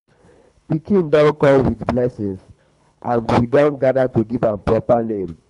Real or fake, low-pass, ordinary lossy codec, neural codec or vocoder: fake; 10.8 kHz; none; codec, 24 kHz, 3 kbps, HILCodec